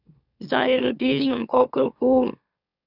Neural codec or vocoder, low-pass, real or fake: autoencoder, 44.1 kHz, a latent of 192 numbers a frame, MeloTTS; 5.4 kHz; fake